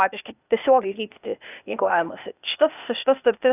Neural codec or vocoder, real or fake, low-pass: codec, 16 kHz, 0.8 kbps, ZipCodec; fake; 3.6 kHz